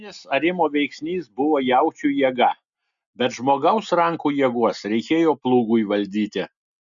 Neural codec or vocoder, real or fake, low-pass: none; real; 7.2 kHz